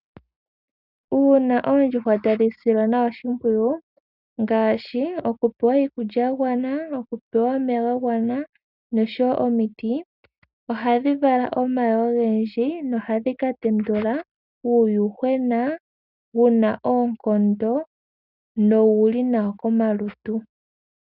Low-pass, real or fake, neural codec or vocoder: 5.4 kHz; real; none